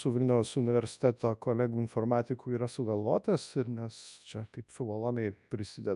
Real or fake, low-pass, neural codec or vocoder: fake; 10.8 kHz; codec, 24 kHz, 0.9 kbps, WavTokenizer, large speech release